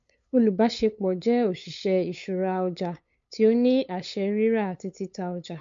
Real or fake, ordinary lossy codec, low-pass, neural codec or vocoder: fake; MP3, 48 kbps; 7.2 kHz; codec, 16 kHz, 8 kbps, FunCodec, trained on LibriTTS, 25 frames a second